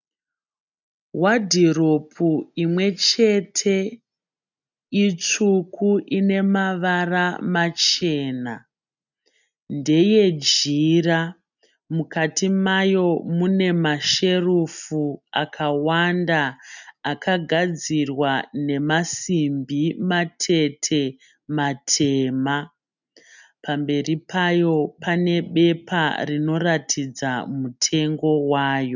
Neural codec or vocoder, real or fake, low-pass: none; real; 7.2 kHz